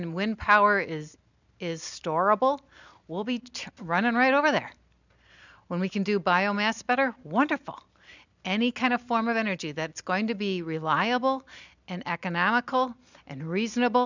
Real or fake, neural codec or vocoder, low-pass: real; none; 7.2 kHz